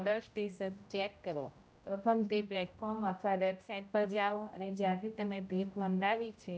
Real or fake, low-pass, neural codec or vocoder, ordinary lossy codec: fake; none; codec, 16 kHz, 0.5 kbps, X-Codec, HuBERT features, trained on general audio; none